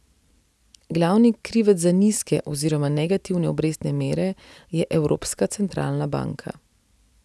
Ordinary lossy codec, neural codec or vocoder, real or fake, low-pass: none; none; real; none